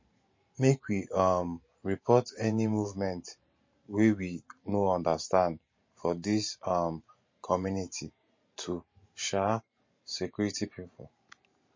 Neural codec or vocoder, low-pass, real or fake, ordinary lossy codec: vocoder, 44.1 kHz, 128 mel bands every 512 samples, BigVGAN v2; 7.2 kHz; fake; MP3, 32 kbps